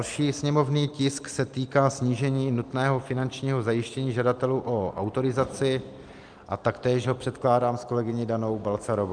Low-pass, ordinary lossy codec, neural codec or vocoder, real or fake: 9.9 kHz; Opus, 32 kbps; none; real